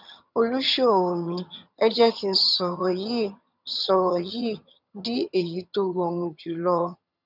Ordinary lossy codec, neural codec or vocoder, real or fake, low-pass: none; vocoder, 22.05 kHz, 80 mel bands, HiFi-GAN; fake; 5.4 kHz